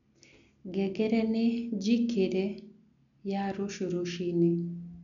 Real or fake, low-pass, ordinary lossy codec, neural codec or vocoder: real; 7.2 kHz; none; none